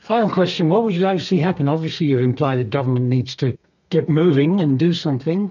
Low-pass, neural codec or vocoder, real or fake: 7.2 kHz; codec, 32 kHz, 1.9 kbps, SNAC; fake